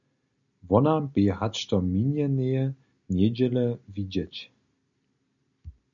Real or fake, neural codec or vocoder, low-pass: real; none; 7.2 kHz